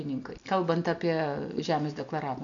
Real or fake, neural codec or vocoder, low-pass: real; none; 7.2 kHz